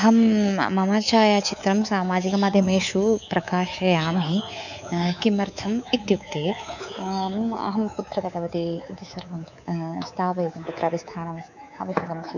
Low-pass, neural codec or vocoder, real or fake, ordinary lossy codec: 7.2 kHz; codec, 24 kHz, 3.1 kbps, DualCodec; fake; none